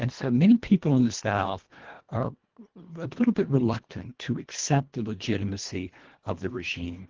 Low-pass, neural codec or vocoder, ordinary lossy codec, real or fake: 7.2 kHz; codec, 24 kHz, 1.5 kbps, HILCodec; Opus, 16 kbps; fake